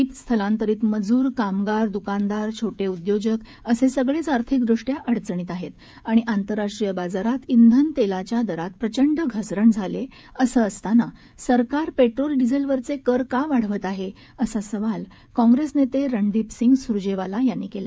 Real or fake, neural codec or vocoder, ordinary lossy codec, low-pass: fake; codec, 16 kHz, 16 kbps, FreqCodec, smaller model; none; none